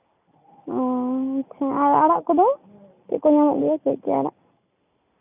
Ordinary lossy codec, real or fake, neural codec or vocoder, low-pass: none; real; none; 3.6 kHz